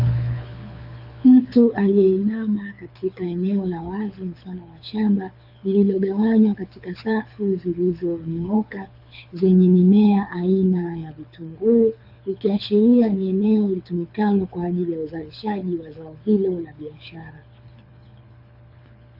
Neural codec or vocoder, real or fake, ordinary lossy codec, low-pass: codec, 24 kHz, 6 kbps, HILCodec; fake; AAC, 48 kbps; 5.4 kHz